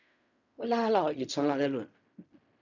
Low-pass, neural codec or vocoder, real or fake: 7.2 kHz; codec, 16 kHz in and 24 kHz out, 0.4 kbps, LongCat-Audio-Codec, fine tuned four codebook decoder; fake